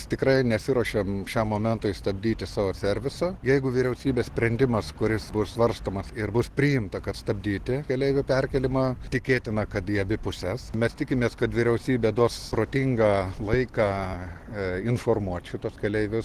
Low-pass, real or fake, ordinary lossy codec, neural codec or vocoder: 14.4 kHz; real; Opus, 16 kbps; none